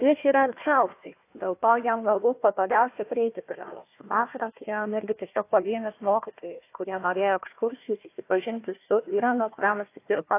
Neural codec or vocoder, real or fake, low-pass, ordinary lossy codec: codec, 16 kHz, 1 kbps, FunCodec, trained on Chinese and English, 50 frames a second; fake; 3.6 kHz; AAC, 24 kbps